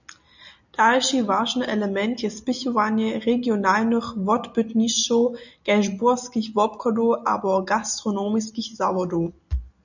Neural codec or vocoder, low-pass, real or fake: none; 7.2 kHz; real